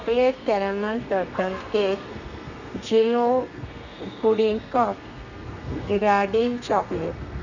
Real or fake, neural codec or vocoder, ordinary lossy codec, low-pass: fake; codec, 32 kHz, 1.9 kbps, SNAC; none; 7.2 kHz